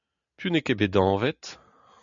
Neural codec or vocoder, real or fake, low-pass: none; real; 7.2 kHz